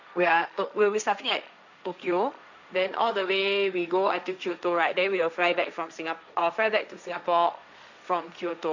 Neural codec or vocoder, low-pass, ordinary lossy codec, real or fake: codec, 16 kHz, 1.1 kbps, Voila-Tokenizer; 7.2 kHz; none; fake